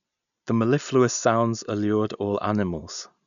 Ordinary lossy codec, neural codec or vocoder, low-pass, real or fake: none; none; 7.2 kHz; real